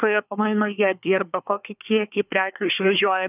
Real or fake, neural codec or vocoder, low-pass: fake; codec, 24 kHz, 1 kbps, SNAC; 3.6 kHz